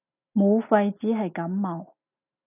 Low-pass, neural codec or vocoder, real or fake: 3.6 kHz; none; real